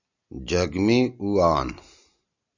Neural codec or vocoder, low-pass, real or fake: none; 7.2 kHz; real